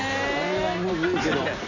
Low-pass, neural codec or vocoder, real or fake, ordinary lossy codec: 7.2 kHz; none; real; none